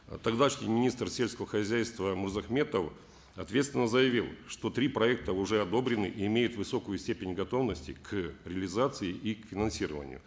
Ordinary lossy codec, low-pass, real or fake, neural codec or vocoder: none; none; real; none